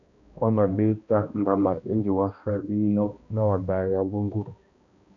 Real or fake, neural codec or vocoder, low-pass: fake; codec, 16 kHz, 1 kbps, X-Codec, HuBERT features, trained on balanced general audio; 7.2 kHz